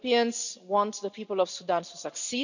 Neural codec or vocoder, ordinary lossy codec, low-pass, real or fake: none; none; 7.2 kHz; real